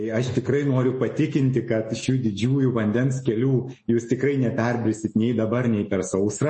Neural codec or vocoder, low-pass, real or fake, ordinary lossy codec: autoencoder, 48 kHz, 128 numbers a frame, DAC-VAE, trained on Japanese speech; 10.8 kHz; fake; MP3, 32 kbps